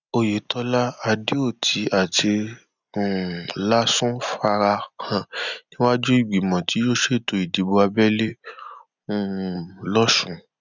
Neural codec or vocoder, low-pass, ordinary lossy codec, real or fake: none; 7.2 kHz; none; real